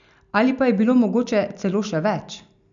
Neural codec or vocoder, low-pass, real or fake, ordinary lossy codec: none; 7.2 kHz; real; none